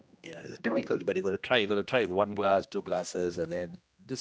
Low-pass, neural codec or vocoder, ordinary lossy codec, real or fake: none; codec, 16 kHz, 1 kbps, X-Codec, HuBERT features, trained on general audio; none; fake